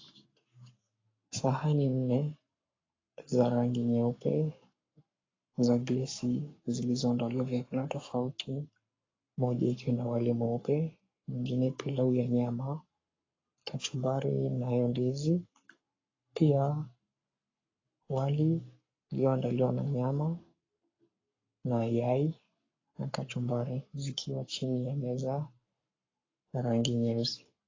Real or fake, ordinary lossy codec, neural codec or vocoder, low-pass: fake; AAC, 32 kbps; codec, 44.1 kHz, 7.8 kbps, Pupu-Codec; 7.2 kHz